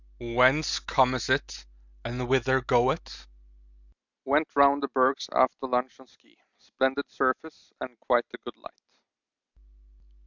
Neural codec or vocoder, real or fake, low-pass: none; real; 7.2 kHz